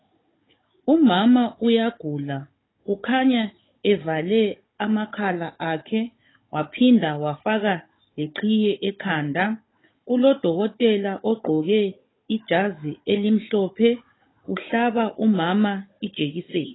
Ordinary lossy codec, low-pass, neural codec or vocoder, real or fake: AAC, 16 kbps; 7.2 kHz; codec, 16 kHz, 16 kbps, FunCodec, trained on Chinese and English, 50 frames a second; fake